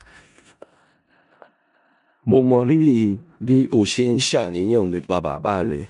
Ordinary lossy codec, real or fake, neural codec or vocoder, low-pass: none; fake; codec, 16 kHz in and 24 kHz out, 0.4 kbps, LongCat-Audio-Codec, four codebook decoder; 10.8 kHz